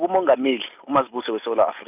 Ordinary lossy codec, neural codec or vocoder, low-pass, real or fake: none; none; 3.6 kHz; real